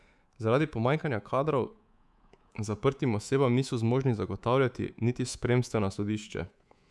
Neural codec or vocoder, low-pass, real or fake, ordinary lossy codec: codec, 24 kHz, 3.1 kbps, DualCodec; none; fake; none